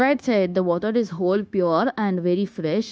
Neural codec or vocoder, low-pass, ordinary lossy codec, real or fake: codec, 16 kHz, 0.9 kbps, LongCat-Audio-Codec; none; none; fake